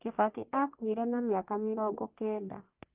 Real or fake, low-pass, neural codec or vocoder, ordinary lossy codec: fake; 3.6 kHz; codec, 44.1 kHz, 1.7 kbps, Pupu-Codec; Opus, 24 kbps